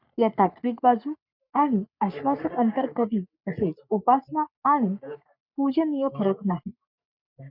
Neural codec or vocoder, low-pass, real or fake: codec, 44.1 kHz, 3.4 kbps, Pupu-Codec; 5.4 kHz; fake